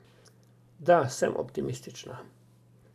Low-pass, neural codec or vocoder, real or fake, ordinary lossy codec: 14.4 kHz; none; real; none